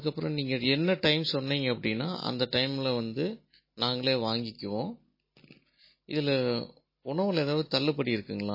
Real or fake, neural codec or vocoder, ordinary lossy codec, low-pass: real; none; MP3, 24 kbps; 5.4 kHz